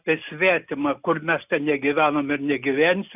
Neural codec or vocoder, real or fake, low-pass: none; real; 3.6 kHz